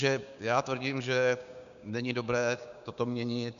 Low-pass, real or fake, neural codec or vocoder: 7.2 kHz; fake; codec, 16 kHz, 6 kbps, DAC